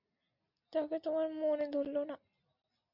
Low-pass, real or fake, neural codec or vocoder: 5.4 kHz; real; none